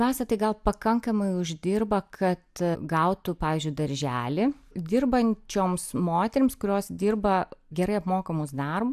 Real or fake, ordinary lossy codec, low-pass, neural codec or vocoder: real; AAC, 96 kbps; 14.4 kHz; none